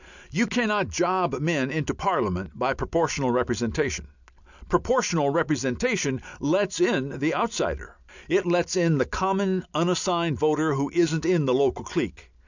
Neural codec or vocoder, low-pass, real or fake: none; 7.2 kHz; real